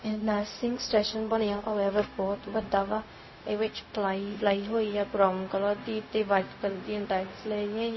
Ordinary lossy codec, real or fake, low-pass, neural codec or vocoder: MP3, 24 kbps; fake; 7.2 kHz; codec, 16 kHz, 0.4 kbps, LongCat-Audio-Codec